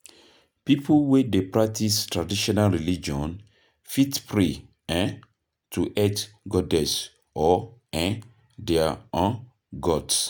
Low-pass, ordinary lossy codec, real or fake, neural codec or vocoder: none; none; real; none